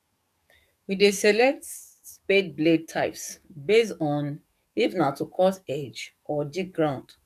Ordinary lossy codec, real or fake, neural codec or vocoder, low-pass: none; fake; codec, 44.1 kHz, 7.8 kbps, DAC; 14.4 kHz